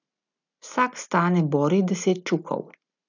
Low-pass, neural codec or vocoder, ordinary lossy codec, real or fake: 7.2 kHz; none; none; real